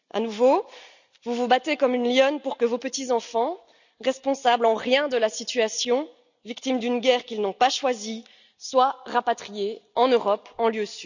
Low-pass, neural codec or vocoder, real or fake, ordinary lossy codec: 7.2 kHz; none; real; none